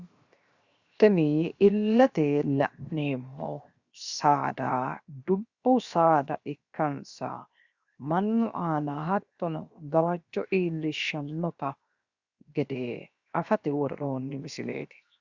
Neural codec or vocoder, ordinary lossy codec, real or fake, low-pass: codec, 16 kHz, 0.7 kbps, FocalCodec; Opus, 64 kbps; fake; 7.2 kHz